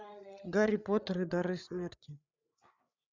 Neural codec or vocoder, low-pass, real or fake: codec, 16 kHz, 8 kbps, FreqCodec, larger model; 7.2 kHz; fake